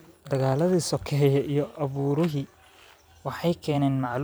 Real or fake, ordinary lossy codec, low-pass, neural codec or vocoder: fake; none; none; vocoder, 44.1 kHz, 128 mel bands every 256 samples, BigVGAN v2